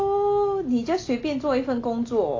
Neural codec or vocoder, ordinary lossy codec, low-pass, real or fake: none; AAC, 32 kbps; 7.2 kHz; real